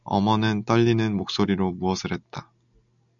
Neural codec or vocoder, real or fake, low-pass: none; real; 7.2 kHz